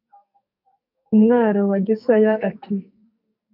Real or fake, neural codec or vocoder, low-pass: fake; codec, 44.1 kHz, 2.6 kbps, SNAC; 5.4 kHz